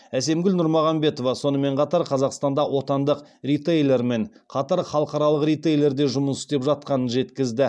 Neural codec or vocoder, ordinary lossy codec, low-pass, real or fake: none; none; none; real